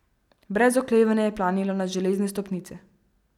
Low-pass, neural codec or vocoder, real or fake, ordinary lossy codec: 19.8 kHz; none; real; none